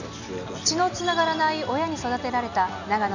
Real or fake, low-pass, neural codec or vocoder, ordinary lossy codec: real; 7.2 kHz; none; none